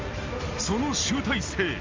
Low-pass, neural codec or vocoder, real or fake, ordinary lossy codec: 7.2 kHz; none; real; Opus, 32 kbps